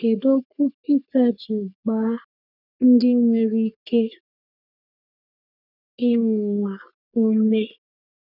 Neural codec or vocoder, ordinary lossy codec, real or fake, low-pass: codec, 44.1 kHz, 3.4 kbps, Pupu-Codec; none; fake; 5.4 kHz